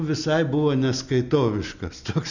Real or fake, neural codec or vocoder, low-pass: real; none; 7.2 kHz